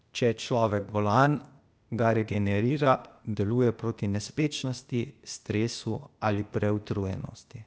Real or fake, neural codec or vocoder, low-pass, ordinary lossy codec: fake; codec, 16 kHz, 0.8 kbps, ZipCodec; none; none